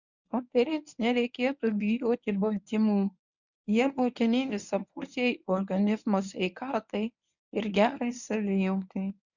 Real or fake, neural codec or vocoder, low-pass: fake; codec, 24 kHz, 0.9 kbps, WavTokenizer, medium speech release version 1; 7.2 kHz